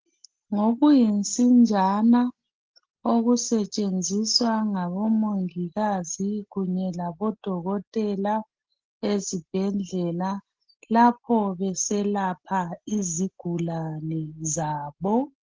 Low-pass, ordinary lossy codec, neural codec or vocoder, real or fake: 7.2 kHz; Opus, 16 kbps; none; real